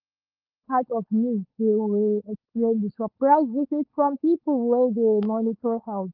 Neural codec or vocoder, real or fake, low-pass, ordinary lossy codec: codec, 16 kHz, 4.8 kbps, FACodec; fake; 5.4 kHz; Opus, 32 kbps